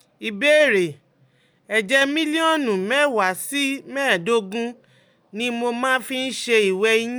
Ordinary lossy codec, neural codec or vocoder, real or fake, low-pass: none; none; real; none